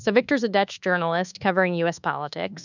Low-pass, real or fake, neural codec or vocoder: 7.2 kHz; fake; codec, 24 kHz, 1.2 kbps, DualCodec